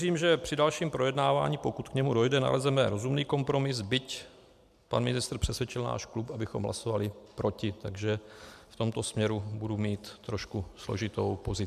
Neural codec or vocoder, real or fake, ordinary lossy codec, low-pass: none; real; MP3, 96 kbps; 14.4 kHz